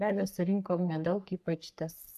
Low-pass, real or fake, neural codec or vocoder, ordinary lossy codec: 14.4 kHz; fake; codec, 44.1 kHz, 3.4 kbps, Pupu-Codec; AAC, 96 kbps